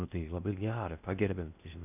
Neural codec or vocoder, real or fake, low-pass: codec, 16 kHz in and 24 kHz out, 0.6 kbps, FocalCodec, streaming, 4096 codes; fake; 3.6 kHz